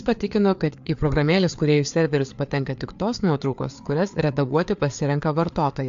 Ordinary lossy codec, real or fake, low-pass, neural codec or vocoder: AAC, 64 kbps; fake; 7.2 kHz; codec, 16 kHz, 4 kbps, FreqCodec, larger model